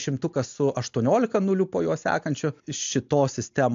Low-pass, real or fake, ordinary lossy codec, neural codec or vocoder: 7.2 kHz; real; AAC, 64 kbps; none